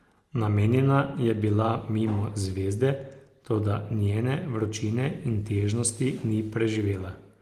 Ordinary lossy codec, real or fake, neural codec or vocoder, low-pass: Opus, 24 kbps; real; none; 14.4 kHz